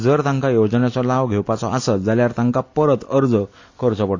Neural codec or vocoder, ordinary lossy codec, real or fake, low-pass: none; AAC, 48 kbps; real; 7.2 kHz